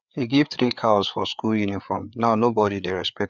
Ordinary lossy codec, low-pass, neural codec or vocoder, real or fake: none; 7.2 kHz; codec, 16 kHz, 4 kbps, FreqCodec, larger model; fake